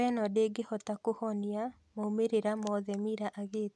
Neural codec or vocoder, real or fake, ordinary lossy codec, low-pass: none; real; none; none